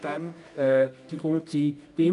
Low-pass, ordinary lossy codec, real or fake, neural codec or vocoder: 10.8 kHz; none; fake; codec, 24 kHz, 0.9 kbps, WavTokenizer, medium music audio release